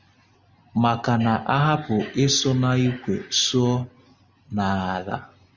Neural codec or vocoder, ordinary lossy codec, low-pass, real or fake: none; Opus, 64 kbps; 7.2 kHz; real